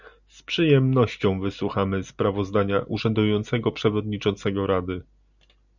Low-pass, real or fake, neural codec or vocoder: 7.2 kHz; real; none